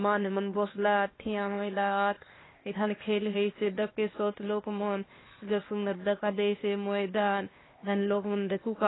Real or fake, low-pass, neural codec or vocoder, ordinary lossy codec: fake; 7.2 kHz; codec, 16 kHz, 0.8 kbps, ZipCodec; AAC, 16 kbps